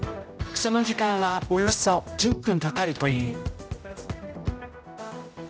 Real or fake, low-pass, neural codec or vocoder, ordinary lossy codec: fake; none; codec, 16 kHz, 0.5 kbps, X-Codec, HuBERT features, trained on general audio; none